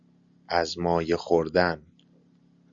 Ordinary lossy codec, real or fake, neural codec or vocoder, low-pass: Opus, 64 kbps; real; none; 7.2 kHz